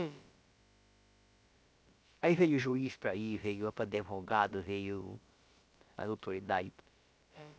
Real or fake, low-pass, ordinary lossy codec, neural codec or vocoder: fake; none; none; codec, 16 kHz, about 1 kbps, DyCAST, with the encoder's durations